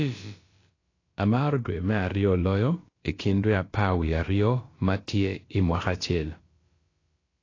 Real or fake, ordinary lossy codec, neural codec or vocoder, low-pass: fake; AAC, 32 kbps; codec, 16 kHz, about 1 kbps, DyCAST, with the encoder's durations; 7.2 kHz